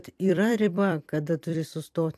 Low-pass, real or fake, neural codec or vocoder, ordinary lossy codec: 14.4 kHz; fake; vocoder, 44.1 kHz, 128 mel bands every 256 samples, BigVGAN v2; AAC, 96 kbps